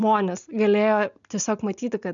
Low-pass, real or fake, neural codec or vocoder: 7.2 kHz; real; none